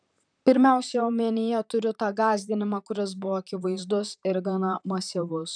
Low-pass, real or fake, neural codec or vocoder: 9.9 kHz; fake; vocoder, 44.1 kHz, 128 mel bands, Pupu-Vocoder